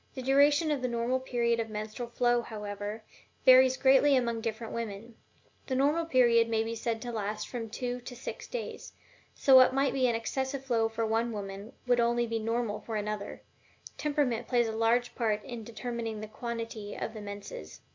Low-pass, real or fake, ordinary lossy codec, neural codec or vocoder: 7.2 kHz; real; MP3, 64 kbps; none